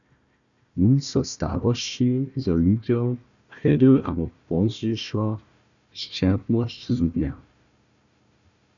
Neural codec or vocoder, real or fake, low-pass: codec, 16 kHz, 1 kbps, FunCodec, trained on Chinese and English, 50 frames a second; fake; 7.2 kHz